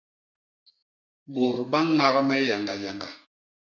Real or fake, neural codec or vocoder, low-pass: fake; codec, 32 kHz, 1.9 kbps, SNAC; 7.2 kHz